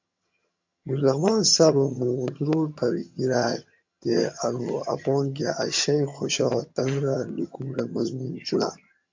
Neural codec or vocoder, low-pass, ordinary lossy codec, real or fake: vocoder, 22.05 kHz, 80 mel bands, HiFi-GAN; 7.2 kHz; MP3, 48 kbps; fake